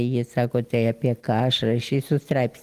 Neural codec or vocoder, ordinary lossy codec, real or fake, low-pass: none; Opus, 24 kbps; real; 14.4 kHz